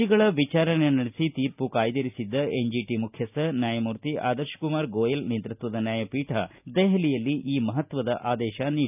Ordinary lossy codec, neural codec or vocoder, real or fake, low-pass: none; none; real; 3.6 kHz